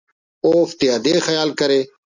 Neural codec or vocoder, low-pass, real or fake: none; 7.2 kHz; real